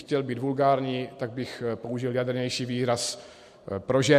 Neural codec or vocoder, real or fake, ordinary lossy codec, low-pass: none; real; MP3, 64 kbps; 14.4 kHz